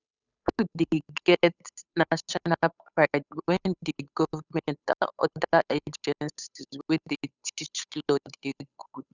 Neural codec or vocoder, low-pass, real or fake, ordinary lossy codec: codec, 16 kHz, 8 kbps, FunCodec, trained on Chinese and English, 25 frames a second; 7.2 kHz; fake; none